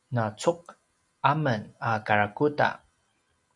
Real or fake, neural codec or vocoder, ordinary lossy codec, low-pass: real; none; MP3, 96 kbps; 10.8 kHz